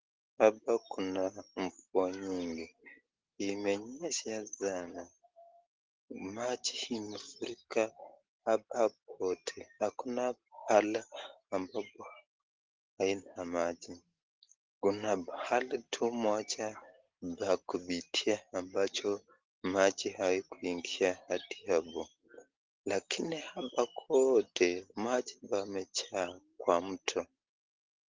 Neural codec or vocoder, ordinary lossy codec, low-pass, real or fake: none; Opus, 16 kbps; 7.2 kHz; real